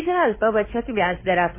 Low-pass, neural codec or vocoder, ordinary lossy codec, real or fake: 3.6 kHz; codec, 16 kHz, 2 kbps, FunCodec, trained on Chinese and English, 25 frames a second; MP3, 16 kbps; fake